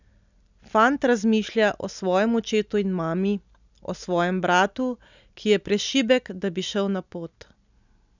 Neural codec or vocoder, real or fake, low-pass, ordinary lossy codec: none; real; 7.2 kHz; none